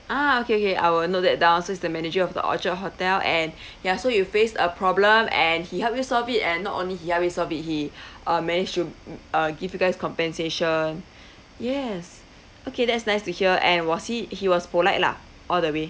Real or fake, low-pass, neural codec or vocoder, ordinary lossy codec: real; none; none; none